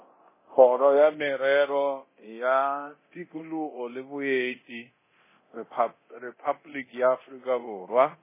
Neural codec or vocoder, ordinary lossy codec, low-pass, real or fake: codec, 24 kHz, 0.9 kbps, DualCodec; MP3, 16 kbps; 3.6 kHz; fake